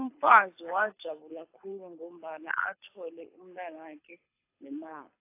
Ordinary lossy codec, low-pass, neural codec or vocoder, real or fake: AAC, 24 kbps; 3.6 kHz; codec, 24 kHz, 6 kbps, HILCodec; fake